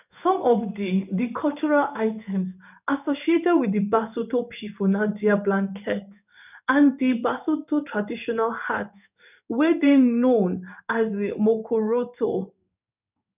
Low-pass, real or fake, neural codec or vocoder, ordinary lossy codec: 3.6 kHz; fake; codec, 16 kHz in and 24 kHz out, 1 kbps, XY-Tokenizer; none